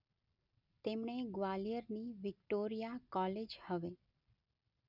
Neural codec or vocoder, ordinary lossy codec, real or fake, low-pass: none; none; real; 5.4 kHz